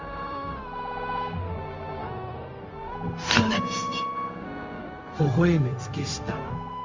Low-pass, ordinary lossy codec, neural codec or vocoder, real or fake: 7.2 kHz; Opus, 32 kbps; codec, 16 kHz, 0.4 kbps, LongCat-Audio-Codec; fake